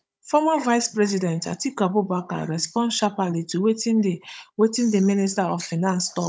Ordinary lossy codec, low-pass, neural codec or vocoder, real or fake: none; none; codec, 16 kHz, 16 kbps, FunCodec, trained on Chinese and English, 50 frames a second; fake